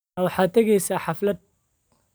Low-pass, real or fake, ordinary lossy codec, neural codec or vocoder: none; fake; none; vocoder, 44.1 kHz, 128 mel bands every 256 samples, BigVGAN v2